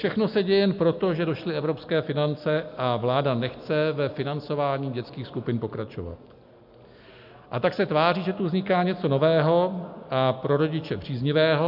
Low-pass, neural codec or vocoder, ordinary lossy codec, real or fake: 5.4 kHz; none; MP3, 48 kbps; real